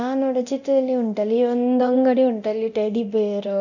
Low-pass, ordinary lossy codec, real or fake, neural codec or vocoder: 7.2 kHz; none; fake; codec, 24 kHz, 0.9 kbps, DualCodec